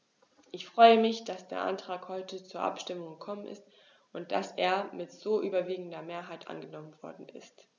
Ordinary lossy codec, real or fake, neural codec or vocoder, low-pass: none; real; none; 7.2 kHz